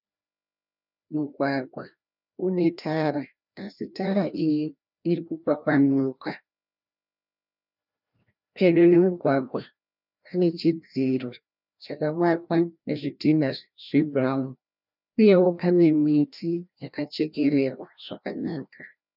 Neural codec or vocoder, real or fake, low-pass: codec, 16 kHz, 1 kbps, FreqCodec, larger model; fake; 5.4 kHz